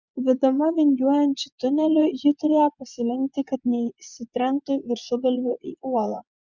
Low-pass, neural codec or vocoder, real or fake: 7.2 kHz; vocoder, 24 kHz, 100 mel bands, Vocos; fake